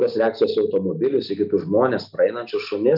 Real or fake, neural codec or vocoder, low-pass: real; none; 5.4 kHz